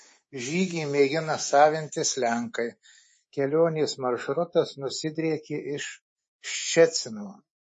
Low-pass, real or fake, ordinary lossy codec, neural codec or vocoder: 10.8 kHz; fake; MP3, 32 kbps; codec, 24 kHz, 3.1 kbps, DualCodec